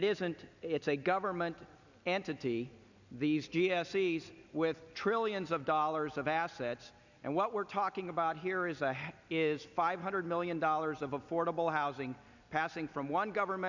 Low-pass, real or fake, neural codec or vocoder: 7.2 kHz; real; none